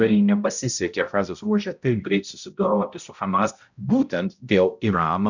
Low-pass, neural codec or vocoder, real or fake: 7.2 kHz; codec, 16 kHz, 0.5 kbps, X-Codec, HuBERT features, trained on balanced general audio; fake